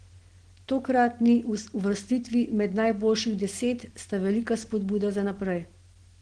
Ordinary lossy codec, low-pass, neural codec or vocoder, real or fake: Opus, 16 kbps; 9.9 kHz; none; real